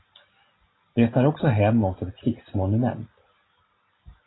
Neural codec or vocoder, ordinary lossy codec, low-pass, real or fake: none; AAC, 16 kbps; 7.2 kHz; real